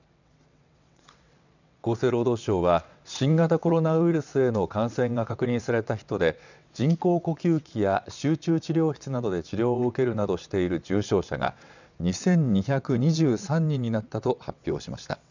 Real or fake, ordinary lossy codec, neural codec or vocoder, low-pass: fake; none; vocoder, 22.05 kHz, 80 mel bands, WaveNeXt; 7.2 kHz